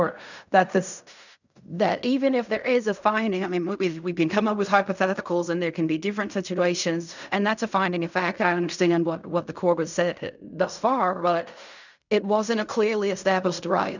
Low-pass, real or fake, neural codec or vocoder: 7.2 kHz; fake; codec, 16 kHz in and 24 kHz out, 0.4 kbps, LongCat-Audio-Codec, fine tuned four codebook decoder